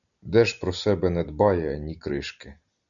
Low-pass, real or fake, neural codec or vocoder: 7.2 kHz; real; none